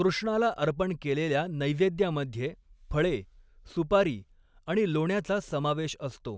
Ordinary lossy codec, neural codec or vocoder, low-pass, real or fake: none; none; none; real